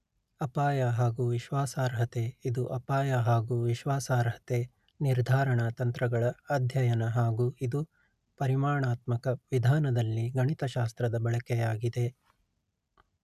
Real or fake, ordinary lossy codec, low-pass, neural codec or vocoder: real; none; 14.4 kHz; none